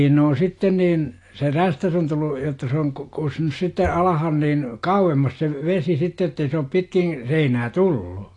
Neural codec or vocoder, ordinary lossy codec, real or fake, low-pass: none; AAC, 48 kbps; real; 10.8 kHz